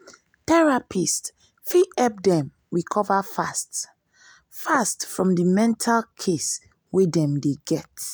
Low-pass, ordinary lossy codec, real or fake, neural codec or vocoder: none; none; real; none